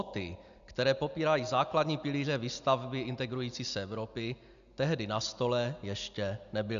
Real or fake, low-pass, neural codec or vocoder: real; 7.2 kHz; none